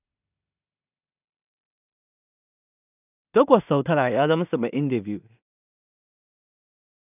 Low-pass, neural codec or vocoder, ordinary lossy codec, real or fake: 3.6 kHz; codec, 16 kHz in and 24 kHz out, 0.4 kbps, LongCat-Audio-Codec, two codebook decoder; none; fake